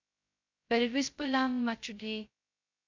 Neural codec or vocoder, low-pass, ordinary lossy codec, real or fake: codec, 16 kHz, 0.2 kbps, FocalCodec; 7.2 kHz; MP3, 64 kbps; fake